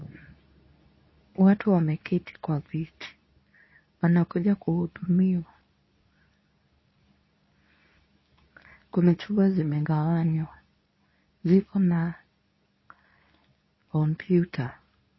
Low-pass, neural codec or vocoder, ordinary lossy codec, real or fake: 7.2 kHz; codec, 24 kHz, 0.9 kbps, WavTokenizer, medium speech release version 2; MP3, 24 kbps; fake